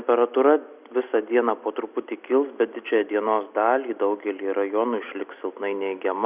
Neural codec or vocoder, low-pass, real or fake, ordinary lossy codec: none; 3.6 kHz; real; Opus, 64 kbps